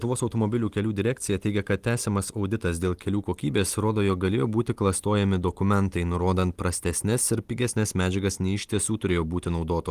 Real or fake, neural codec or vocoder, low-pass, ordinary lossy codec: real; none; 14.4 kHz; Opus, 24 kbps